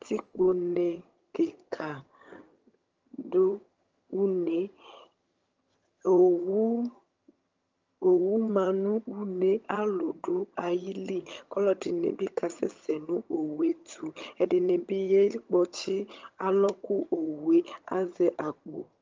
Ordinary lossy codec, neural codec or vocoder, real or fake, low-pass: Opus, 24 kbps; vocoder, 22.05 kHz, 80 mel bands, HiFi-GAN; fake; 7.2 kHz